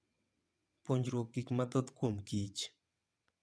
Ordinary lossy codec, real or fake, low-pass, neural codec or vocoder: none; fake; none; vocoder, 22.05 kHz, 80 mel bands, WaveNeXt